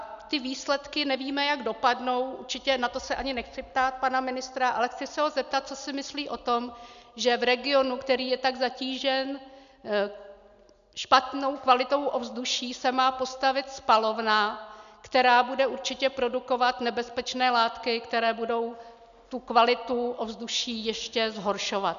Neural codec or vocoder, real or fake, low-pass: none; real; 7.2 kHz